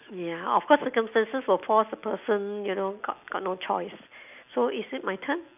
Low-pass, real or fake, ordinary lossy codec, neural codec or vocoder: 3.6 kHz; real; none; none